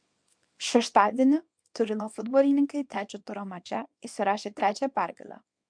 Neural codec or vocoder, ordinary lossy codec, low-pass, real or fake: codec, 24 kHz, 0.9 kbps, WavTokenizer, small release; MP3, 64 kbps; 9.9 kHz; fake